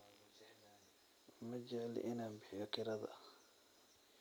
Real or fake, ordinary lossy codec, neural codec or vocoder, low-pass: real; none; none; none